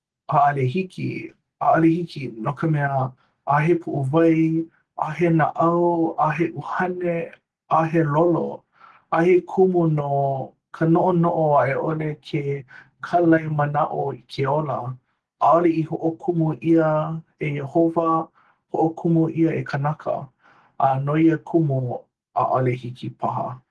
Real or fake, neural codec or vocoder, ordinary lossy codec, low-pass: real; none; Opus, 16 kbps; 10.8 kHz